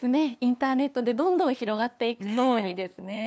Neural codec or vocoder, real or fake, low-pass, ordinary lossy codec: codec, 16 kHz, 2 kbps, FunCodec, trained on LibriTTS, 25 frames a second; fake; none; none